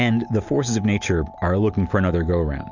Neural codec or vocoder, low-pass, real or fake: none; 7.2 kHz; real